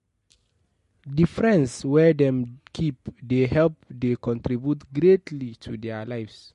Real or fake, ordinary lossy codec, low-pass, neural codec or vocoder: real; MP3, 48 kbps; 14.4 kHz; none